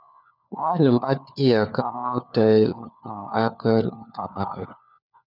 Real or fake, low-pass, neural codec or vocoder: fake; 5.4 kHz; codec, 16 kHz, 4 kbps, FunCodec, trained on LibriTTS, 50 frames a second